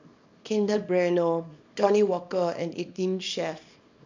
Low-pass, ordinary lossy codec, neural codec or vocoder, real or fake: 7.2 kHz; MP3, 64 kbps; codec, 24 kHz, 0.9 kbps, WavTokenizer, small release; fake